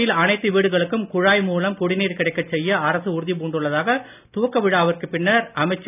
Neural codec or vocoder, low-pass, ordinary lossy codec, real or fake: none; 3.6 kHz; none; real